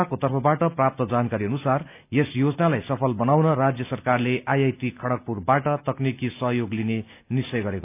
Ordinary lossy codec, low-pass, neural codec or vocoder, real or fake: none; 3.6 kHz; none; real